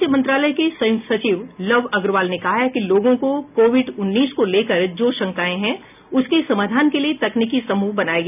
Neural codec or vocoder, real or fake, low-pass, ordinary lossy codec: none; real; 3.6 kHz; none